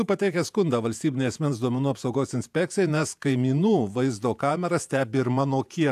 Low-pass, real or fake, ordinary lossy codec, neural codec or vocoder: 14.4 kHz; fake; AAC, 96 kbps; vocoder, 48 kHz, 128 mel bands, Vocos